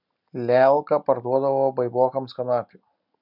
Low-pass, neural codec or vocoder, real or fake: 5.4 kHz; none; real